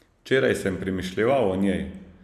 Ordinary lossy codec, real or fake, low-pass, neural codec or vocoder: none; real; 14.4 kHz; none